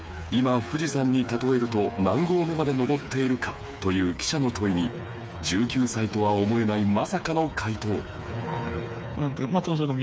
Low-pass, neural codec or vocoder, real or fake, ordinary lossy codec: none; codec, 16 kHz, 4 kbps, FreqCodec, smaller model; fake; none